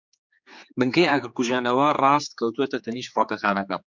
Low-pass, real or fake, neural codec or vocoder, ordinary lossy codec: 7.2 kHz; fake; codec, 16 kHz, 4 kbps, X-Codec, HuBERT features, trained on general audio; MP3, 64 kbps